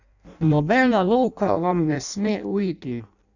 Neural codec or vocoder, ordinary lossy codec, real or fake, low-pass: codec, 16 kHz in and 24 kHz out, 0.6 kbps, FireRedTTS-2 codec; none; fake; 7.2 kHz